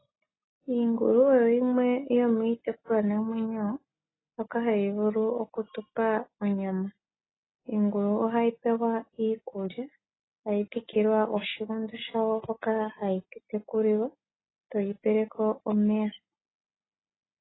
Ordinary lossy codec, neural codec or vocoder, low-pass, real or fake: AAC, 16 kbps; none; 7.2 kHz; real